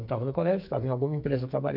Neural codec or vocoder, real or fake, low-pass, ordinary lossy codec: codec, 44.1 kHz, 2.6 kbps, SNAC; fake; 5.4 kHz; none